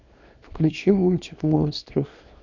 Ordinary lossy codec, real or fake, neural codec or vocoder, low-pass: none; fake; codec, 24 kHz, 0.9 kbps, WavTokenizer, small release; 7.2 kHz